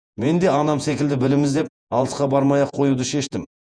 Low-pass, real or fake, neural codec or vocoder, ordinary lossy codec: 9.9 kHz; fake; vocoder, 48 kHz, 128 mel bands, Vocos; none